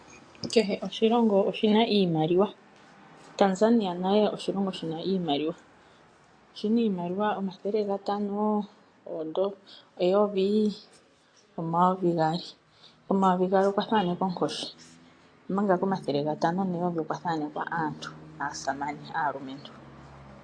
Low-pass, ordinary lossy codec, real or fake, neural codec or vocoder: 9.9 kHz; AAC, 48 kbps; real; none